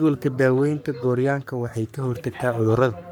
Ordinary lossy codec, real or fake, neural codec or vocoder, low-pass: none; fake; codec, 44.1 kHz, 3.4 kbps, Pupu-Codec; none